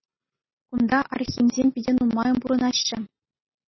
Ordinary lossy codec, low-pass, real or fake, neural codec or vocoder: MP3, 24 kbps; 7.2 kHz; real; none